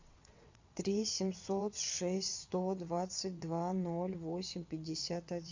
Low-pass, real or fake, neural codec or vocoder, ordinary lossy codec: 7.2 kHz; fake; vocoder, 22.05 kHz, 80 mel bands, WaveNeXt; MP3, 64 kbps